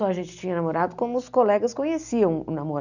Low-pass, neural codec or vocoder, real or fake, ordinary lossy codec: 7.2 kHz; none; real; none